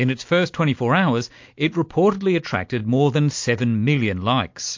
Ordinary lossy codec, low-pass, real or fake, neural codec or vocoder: MP3, 48 kbps; 7.2 kHz; real; none